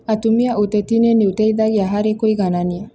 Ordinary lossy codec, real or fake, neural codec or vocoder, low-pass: none; real; none; none